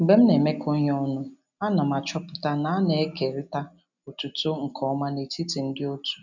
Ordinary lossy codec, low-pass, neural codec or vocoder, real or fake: none; 7.2 kHz; none; real